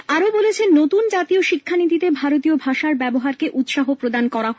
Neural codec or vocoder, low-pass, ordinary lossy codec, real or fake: none; none; none; real